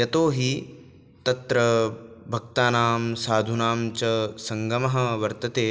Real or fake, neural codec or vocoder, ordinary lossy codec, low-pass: real; none; none; none